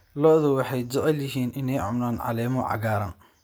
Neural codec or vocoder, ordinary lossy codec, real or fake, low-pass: none; none; real; none